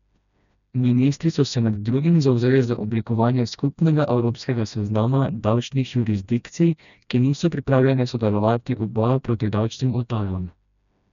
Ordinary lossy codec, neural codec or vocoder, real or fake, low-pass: none; codec, 16 kHz, 1 kbps, FreqCodec, smaller model; fake; 7.2 kHz